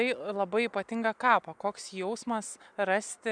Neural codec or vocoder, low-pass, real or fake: none; 9.9 kHz; real